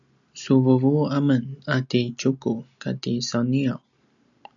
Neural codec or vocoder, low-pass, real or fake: none; 7.2 kHz; real